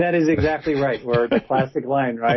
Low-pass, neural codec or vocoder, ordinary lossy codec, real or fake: 7.2 kHz; none; MP3, 24 kbps; real